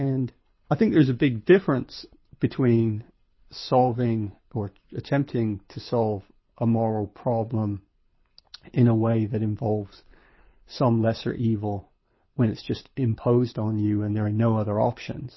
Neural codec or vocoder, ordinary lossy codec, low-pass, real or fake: codec, 24 kHz, 6 kbps, HILCodec; MP3, 24 kbps; 7.2 kHz; fake